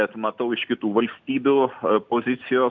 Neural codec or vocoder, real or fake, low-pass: none; real; 7.2 kHz